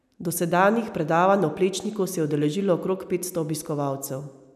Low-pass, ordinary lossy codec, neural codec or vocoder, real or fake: 14.4 kHz; none; none; real